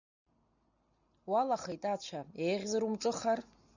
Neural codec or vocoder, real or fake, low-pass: none; real; 7.2 kHz